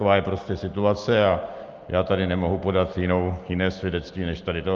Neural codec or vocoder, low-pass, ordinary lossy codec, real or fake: none; 7.2 kHz; Opus, 24 kbps; real